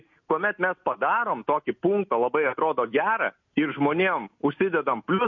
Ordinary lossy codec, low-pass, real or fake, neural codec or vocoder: MP3, 48 kbps; 7.2 kHz; real; none